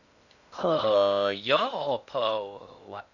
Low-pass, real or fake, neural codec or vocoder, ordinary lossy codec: 7.2 kHz; fake; codec, 16 kHz in and 24 kHz out, 0.6 kbps, FocalCodec, streaming, 2048 codes; none